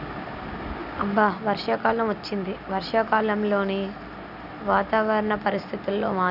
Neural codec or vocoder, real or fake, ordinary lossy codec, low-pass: none; real; none; 5.4 kHz